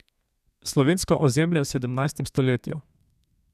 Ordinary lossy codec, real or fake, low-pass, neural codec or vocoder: none; fake; 14.4 kHz; codec, 32 kHz, 1.9 kbps, SNAC